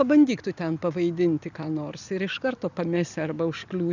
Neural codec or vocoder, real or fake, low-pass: none; real; 7.2 kHz